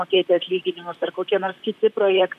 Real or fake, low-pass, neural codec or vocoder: fake; 14.4 kHz; vocoder, 44.1 kHz, 128 mel bands, Pupu-Vocoder